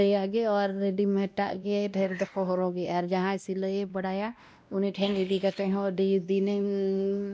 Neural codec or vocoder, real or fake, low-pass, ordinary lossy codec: codec, 16 kHz, 1 kbps, X-Codec, WavLM features, trained on Multilingual LibriSpeech; fake; none; none